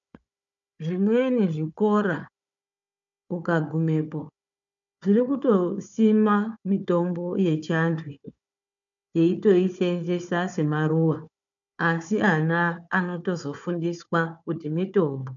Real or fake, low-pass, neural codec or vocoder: fake; 7.2 kHz; codec, 16 kHz, 4 kbps, FunCodec, trained on Chinese and English, 50 frames a second